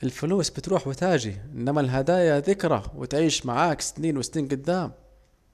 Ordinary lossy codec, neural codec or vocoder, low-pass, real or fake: none; none; 14.4 kHz; real